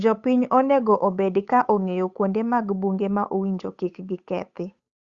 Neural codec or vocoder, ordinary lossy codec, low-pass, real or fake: codec, 16 kHz, 8 kbps, FunCodec, trained on Chinese and English, 25 frames a second; Opus, 64 kbps; 7.2 kHz; fake